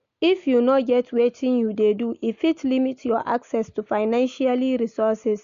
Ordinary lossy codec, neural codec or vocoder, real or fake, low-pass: MP3, 64 kbps; none; real; 7.2 kHz